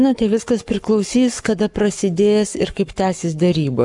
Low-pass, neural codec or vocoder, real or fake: 10.8 kHz; codec, 44.1 kHz, 7.8 kbps, Pupu-Codec; fake